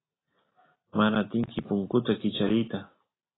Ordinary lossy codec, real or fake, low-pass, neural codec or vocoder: AAC, 16 kbps; real; 7.2 kHz; none